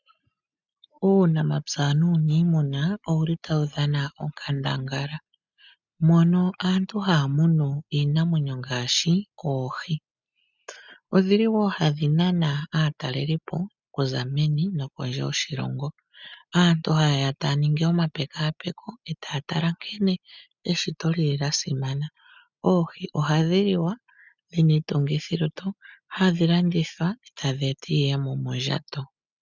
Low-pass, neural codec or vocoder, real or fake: 7.2 kHz; none; real